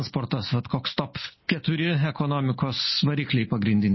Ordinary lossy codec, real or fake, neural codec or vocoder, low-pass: MP3, 24 kbps; real; none; 7.2 kHz